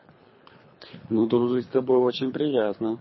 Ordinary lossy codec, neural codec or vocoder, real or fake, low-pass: MP3, 24 kbps; codec, 24 kHz, 3 kbps, HILCodec; fake; 7.2 kHz